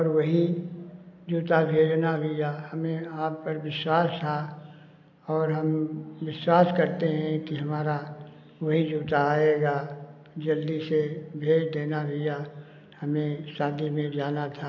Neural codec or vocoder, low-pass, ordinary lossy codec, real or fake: none; 7.2 kHz; AAC, 48 kbps; real